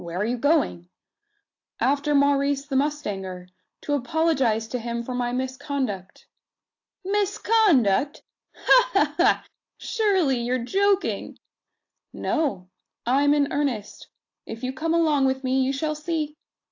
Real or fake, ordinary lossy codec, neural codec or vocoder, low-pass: real; AAC, 48 kbps; none; 7.2 kHz